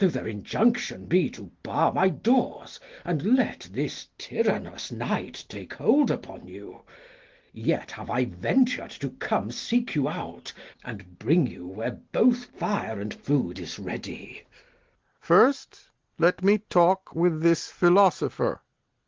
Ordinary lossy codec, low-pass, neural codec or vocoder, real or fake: Opus, 16 kbps; 7.2 kHz; none; real